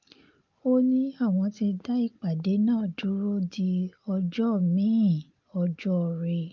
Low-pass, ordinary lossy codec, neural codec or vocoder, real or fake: 7.2 kHz; Opus, 24 kbps; none; real